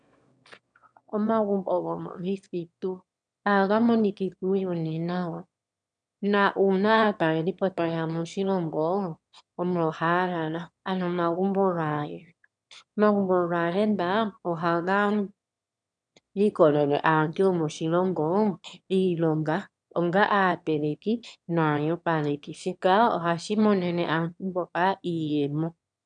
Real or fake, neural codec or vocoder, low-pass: fake; autoencoder, 22.05 kHz, a latent of 192 numbers a frame, VITS, trained on one speaker; 9.9 kHz